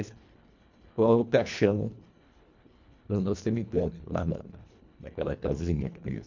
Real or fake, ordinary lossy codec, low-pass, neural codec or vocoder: fake; MP3, 64 kbps; 7.2 kHz; codec, 24 kHz, 1.5 kbps, HILCodec